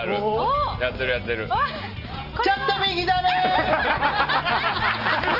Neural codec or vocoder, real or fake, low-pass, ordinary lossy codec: none; real; 5.4 kHz; Opus, 24 kbps